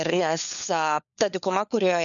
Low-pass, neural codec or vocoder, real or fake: 7.2 kHz; codec, 16 kHz, 4 kbps, FunCodec, trained on LibriTTS, 50 frames a second; fake